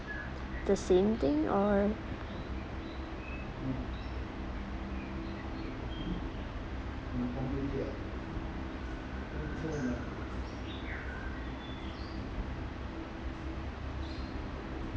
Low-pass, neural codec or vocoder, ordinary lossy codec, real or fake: none; none; none; real